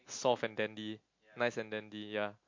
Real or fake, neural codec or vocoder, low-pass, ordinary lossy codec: real; none; 7.2 kHz; MP3, 48 kbps